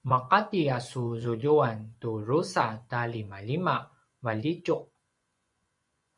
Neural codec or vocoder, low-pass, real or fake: vocoder, 24 kHz, 100 mel bands, Vocos; 10.8 kHz; fake